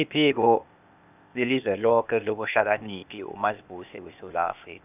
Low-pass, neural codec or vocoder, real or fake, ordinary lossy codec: 3.6 kHz; codec, 16 kHz, 0.8 kbps, ZipCodec; fake; none